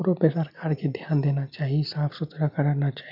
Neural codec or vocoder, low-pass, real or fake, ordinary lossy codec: none; 5.4 kHz; real; none